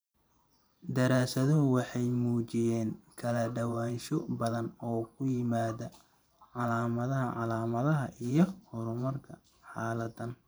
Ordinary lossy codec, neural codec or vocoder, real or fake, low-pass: none; vocoder, 44.1 kHz, 128 mel bands every 512 samples, BigVGAN v2; fake; none